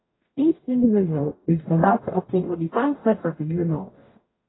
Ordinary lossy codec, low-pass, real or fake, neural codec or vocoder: AAC, 16 kbps; 7.2 kHz; fake; codec, 44.1 kHz, 0.9 kbps, DAC